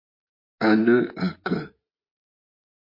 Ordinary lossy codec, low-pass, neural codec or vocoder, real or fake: MP3, 32 kbps; 5.4 kHz; vocoder, 24 kHz, 100 mel bands, Vocos; fake